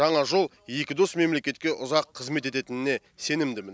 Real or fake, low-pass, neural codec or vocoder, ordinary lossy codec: real; none; none; none